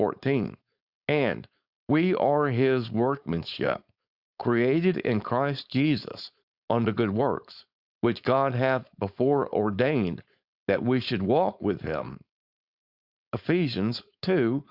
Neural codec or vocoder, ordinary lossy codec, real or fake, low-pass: codec, 16 kHz, 4.8 kbps, FACodec; Opus, 64 kbps; fake; 5.4 kHz